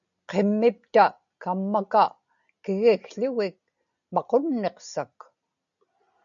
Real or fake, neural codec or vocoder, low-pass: real; none; 7.2 kHz